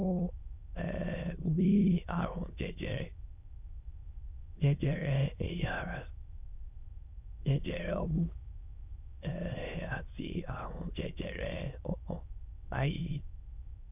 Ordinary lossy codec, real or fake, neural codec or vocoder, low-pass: none; fake; autoencoder, 22.05 kHz, a latent of 192 numbers a frame, VITS, trained on many speakers; 3.6 kHz